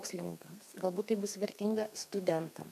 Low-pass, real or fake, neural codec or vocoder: 14.4 kHz; fake; codec, 32 kHz, 1.9 kbps, SNAC